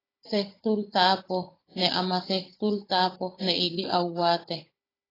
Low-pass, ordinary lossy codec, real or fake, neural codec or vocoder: 5.4 kHz; AAC, 24 kbps; fake; codec, 16 kHz, 16 kbps, FunCodec, trained on Chinese and English, 50 frames a second